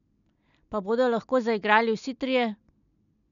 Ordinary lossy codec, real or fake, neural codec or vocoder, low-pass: none; real; none; 7.2 kHz